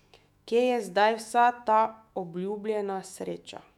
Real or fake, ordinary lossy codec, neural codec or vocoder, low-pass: fake; none; autoencoder, 48 kHz, 128 numbers a frame, DAC-VAE, trained on Japanese speech; 19.8 kHz